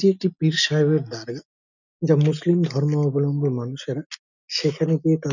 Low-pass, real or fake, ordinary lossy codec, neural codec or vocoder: 7.2 kHz; real; none; none